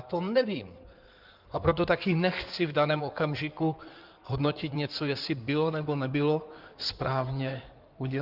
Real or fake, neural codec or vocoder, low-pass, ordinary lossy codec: fake; codec, 16 kHz in and 24 kHz out, 2.2 kbps, FireRedTTS-2 codec; 5.4 kHz; Opus, 24 kbps